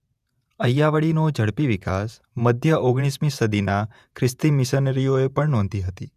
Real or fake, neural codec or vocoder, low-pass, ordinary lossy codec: fake; vocoder, 48 kHz, 128 mel bands, Vocos; 14.4 kHz; none